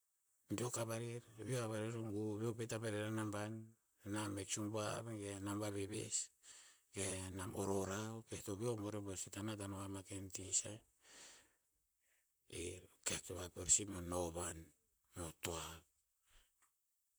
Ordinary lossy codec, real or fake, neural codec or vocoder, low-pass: none; fake; vocoder, 44.1 kHz, 128 mel bands, Pupu-Vocoder; none